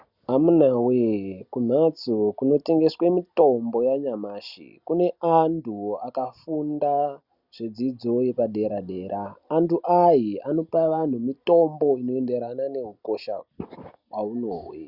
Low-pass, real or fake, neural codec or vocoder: 5.4 kHz; real; none